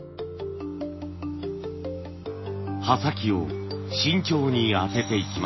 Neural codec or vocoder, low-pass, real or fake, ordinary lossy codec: none; 7.2 kHz; real; MP3, 24 kbps